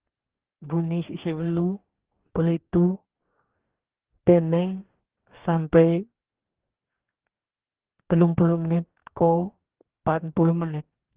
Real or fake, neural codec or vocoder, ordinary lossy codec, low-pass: fake; codec, 44.1 kHz, 2.6 kbps, DAC; Opus, 16 kbps; 3.6 kHz